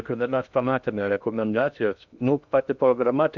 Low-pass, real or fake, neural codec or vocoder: 7.2 kHz; fake; codec, 16 kHz in and 24 kHz out, 0.6 kbps, FocalCodec, streaming, 2048 codes